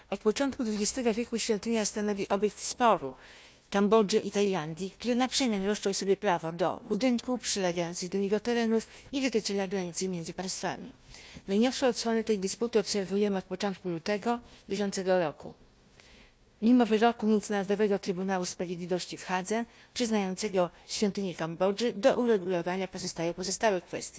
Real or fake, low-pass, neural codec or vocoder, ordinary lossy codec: fake; none; codec, 16 kHz, 1 kbps, FunCodec, trained on Chinese and English, 50 frames a second; none